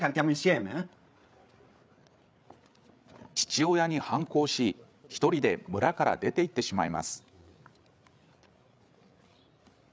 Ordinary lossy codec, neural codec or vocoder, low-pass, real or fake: none; codec, 16 kHz, 8 kbps, FreqCodec, larger model; none; fake